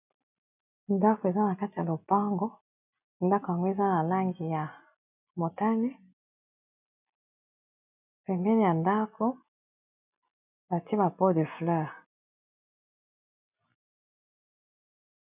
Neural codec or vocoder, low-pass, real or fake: none; 3.6 kHz; real